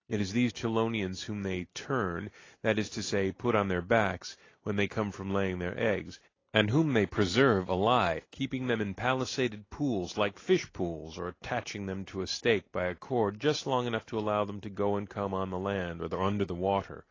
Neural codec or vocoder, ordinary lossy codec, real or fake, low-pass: none; AAC, 32 kbps; real; 7.2 kHz